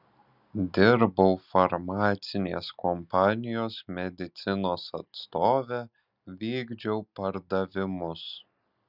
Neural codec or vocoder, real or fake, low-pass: none; real; 5.4 kHz